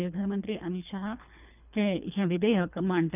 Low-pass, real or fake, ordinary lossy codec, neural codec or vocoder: 3.6 kHz; fake; none; codec, 24 kHz, 3 kbps, HILCodec